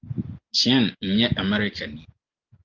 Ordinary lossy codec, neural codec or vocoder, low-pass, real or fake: Opus, 32 kbps; none; 7.2 kHz; real